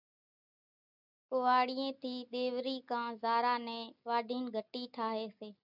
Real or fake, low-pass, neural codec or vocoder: real; 5.4 kHz; none